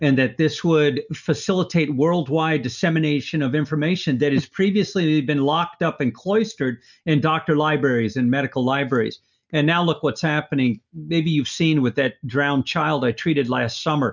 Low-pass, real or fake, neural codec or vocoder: 7.2 kHz; real; none